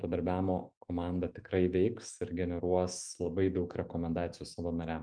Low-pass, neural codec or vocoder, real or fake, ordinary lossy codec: 9.9 kHz; autoencoder, 48 kHz, 128 numbers a frame, DAC-VAE, trained on Japanese speech; fake; Opus, 32 kbps